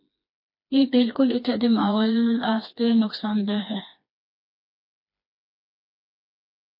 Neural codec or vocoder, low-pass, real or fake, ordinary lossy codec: codec, 16 kHz, 2 kbps, FreqCodec, smaller model; 5.4 kHz; fake; MP3, 32 kbps